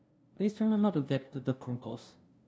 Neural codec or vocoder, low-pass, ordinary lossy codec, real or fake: codec, 16 kHz, 0.5 kbps, FunCodec, trained on LibriTTS, 25 frames a second; none; none; fake